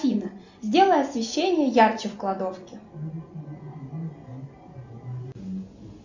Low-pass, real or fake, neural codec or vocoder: 7.2 kHz; real; none